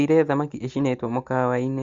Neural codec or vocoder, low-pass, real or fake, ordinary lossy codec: none; 7.2 kHz; real; Opus, 32 kbps